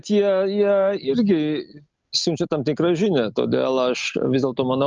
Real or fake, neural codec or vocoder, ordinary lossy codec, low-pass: real; none; Opus, 24 kbps; 7.2 kHz